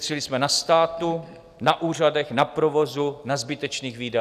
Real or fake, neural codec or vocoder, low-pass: real; none; 14.4 kHz